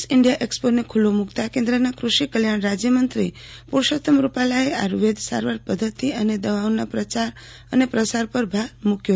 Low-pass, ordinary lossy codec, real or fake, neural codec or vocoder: none; none; real; none